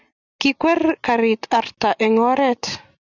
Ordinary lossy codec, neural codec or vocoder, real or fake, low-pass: Opus, 64 kbps; none; real; 7.2 kHz